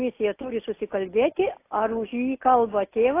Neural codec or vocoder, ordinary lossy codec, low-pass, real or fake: none; AAC, 24 kbps; 3.6 kHz; real